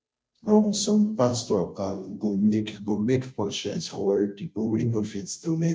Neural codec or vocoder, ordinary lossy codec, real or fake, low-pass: codec, 16 kHz, 0.5 kbps, FunCodec, trained on Chinese and English, 25 frames a second; none; fake; none